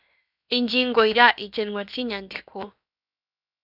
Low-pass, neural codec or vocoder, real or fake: 5.4 kHz; codec, 16 kHz, 0.7 kbps, FocalCodec; fake